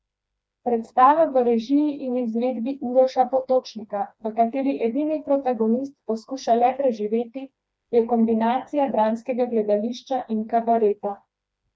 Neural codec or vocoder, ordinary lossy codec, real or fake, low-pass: codec, 16 kHz, 2 kbps, FreqCodec, smaller model; none; fake; none